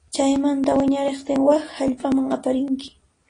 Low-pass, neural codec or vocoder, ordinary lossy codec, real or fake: 9.9 kHz; none; AAC, 32 kbps; real